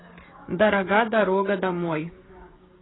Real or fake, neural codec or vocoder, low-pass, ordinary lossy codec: fake; codec, 16 kHz, 16 kbps, FreqCodec, smaller model; 7.2 kHz; AAC, 16 kbps